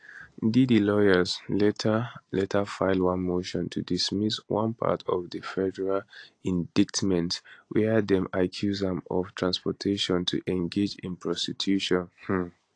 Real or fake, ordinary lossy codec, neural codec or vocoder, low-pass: real; AAC, 48 kbps; none; 9.9 kHz